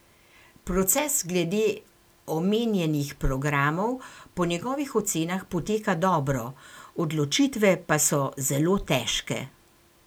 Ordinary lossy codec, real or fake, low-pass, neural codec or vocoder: none; real; none; none